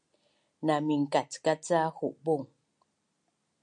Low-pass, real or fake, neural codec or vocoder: 9.9 kHz; real; none